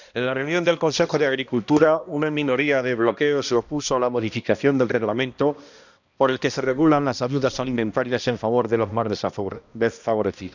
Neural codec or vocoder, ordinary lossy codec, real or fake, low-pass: codec, 16 kHz, 1 kbps, X-Codec, HuBERT features, trained on balanced general audio; none; fake; 7.2 kHz